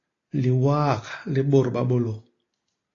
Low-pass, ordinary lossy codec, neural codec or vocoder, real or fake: 7.2 kHz; AAC, 32 kbps; none; real